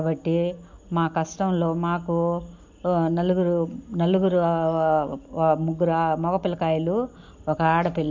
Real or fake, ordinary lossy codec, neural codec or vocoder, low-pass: real; MP3, 64 kbps; none; 7.2 kHz